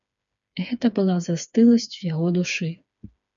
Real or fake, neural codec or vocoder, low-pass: fake; codec, 16 kHz, 4 kbps, FreqCodec, smaller model; 7.2 kHz